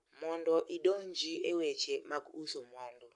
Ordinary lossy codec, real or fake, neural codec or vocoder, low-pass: none; fake; codec, 44.1 kHz, 7.8 kbps, Pupu-Codec; 10.8 kHz